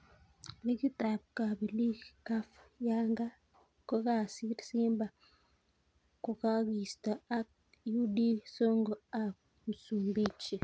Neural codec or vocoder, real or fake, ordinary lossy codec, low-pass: none; real; none; none